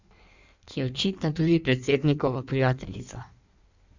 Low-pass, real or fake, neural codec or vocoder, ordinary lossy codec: 7.2 kHz; fake; codec, 16 kHz in and 24 kHz out, 1.1 kbps, FireRedTTS-2 codec; none